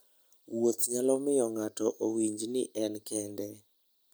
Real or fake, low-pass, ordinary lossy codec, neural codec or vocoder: real; none; none; none